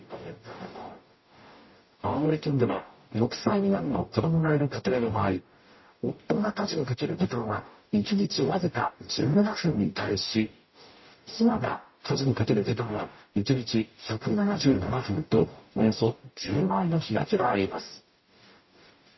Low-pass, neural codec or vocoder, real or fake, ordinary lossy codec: 7.2 kHz; codec, 44.1 kHz, 0.9 kbps, DAC; fake; MP3, 24 kbps